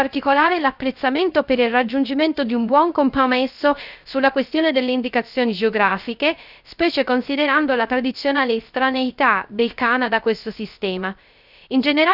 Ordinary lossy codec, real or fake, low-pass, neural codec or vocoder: none; fake; 5.4 kHz; codec, 16 kHz, 0.3 kbps, FocalCodec